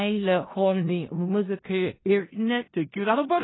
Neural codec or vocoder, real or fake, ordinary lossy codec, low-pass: codec, 16 kHz in and 24 kHz out, 0.4 kbps, LongCat-Audio-Codec, four codebook decoder; fake; AAC, 16 kbps; 7.2 kHz